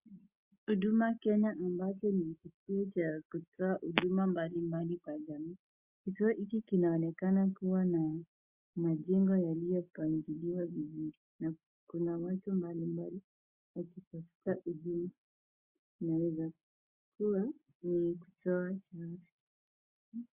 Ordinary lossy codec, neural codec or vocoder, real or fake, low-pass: Opus, 64 kbps; none; real; 3.6 kHz